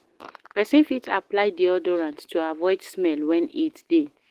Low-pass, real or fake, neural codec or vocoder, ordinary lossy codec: 14.4 kHz; fake; autoencoder, 48 kHz, 128 numbers a frame, DAC-VAE, trained on Japanese speech; Opus, 16 kbps